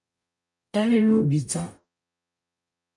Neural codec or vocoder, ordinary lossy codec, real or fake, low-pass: codec, 44.1 kHz, 0.9 kbps, DAC; MP3, 96 kbps; fake; 10.8 kHz